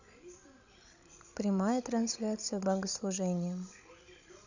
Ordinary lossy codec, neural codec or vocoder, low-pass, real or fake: none; codec, 16 kHz, 16 kbps, FreqCodec, larger model; 7.2 kHz; fake